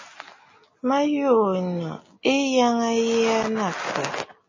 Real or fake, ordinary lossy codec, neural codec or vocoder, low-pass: real; MP3, 32 kbps; none; 7.2 kHz